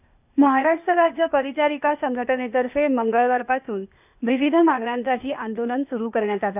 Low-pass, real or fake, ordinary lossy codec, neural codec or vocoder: 3.6 kHz; fake; none; codec, 16 kHz, 0.8 kbps, ZipCodec